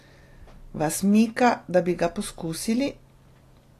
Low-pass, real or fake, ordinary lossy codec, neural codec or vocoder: 14.4 kHz; real; AAC, 48 kbps; none